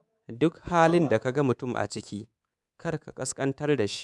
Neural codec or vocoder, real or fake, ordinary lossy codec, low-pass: codec, 24 kHz, 3.1 kbps, DualCodec; fake; none; none